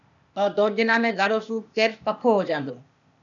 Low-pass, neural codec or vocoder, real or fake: 7.2 kHz; codec, 16 kHz, 0.8 kbps, ZipCodec; fake